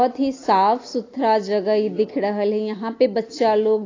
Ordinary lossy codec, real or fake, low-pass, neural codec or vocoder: AAC, 32 kbps; real; 7.2 kHz; none